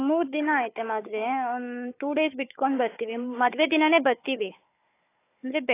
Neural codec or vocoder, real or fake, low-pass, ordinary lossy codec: codec, 16 kHz, 4 kbps, FunCodec, trained on Chinese and English, 50 frames a second; fake; 3.6 kHz; AAC, 24 kbps